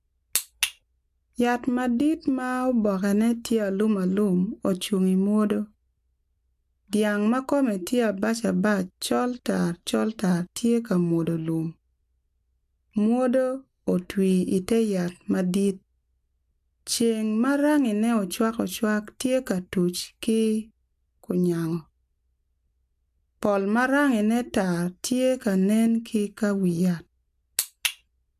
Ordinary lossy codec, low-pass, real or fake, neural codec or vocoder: AAC, 96 kbps; 14.4 kHz; real; none